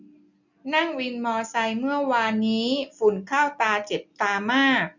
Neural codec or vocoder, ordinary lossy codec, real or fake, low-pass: none; none; real; 7.2 kHz